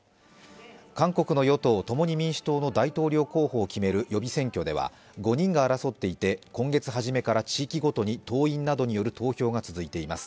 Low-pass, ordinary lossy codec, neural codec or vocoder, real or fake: none; none; none; real